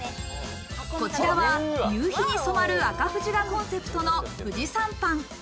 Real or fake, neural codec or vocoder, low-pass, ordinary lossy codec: real; none; none; none